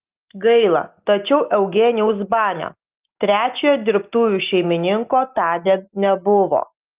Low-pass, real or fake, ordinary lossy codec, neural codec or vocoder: 3.6 kHz; real; Opus, 32 kbps; none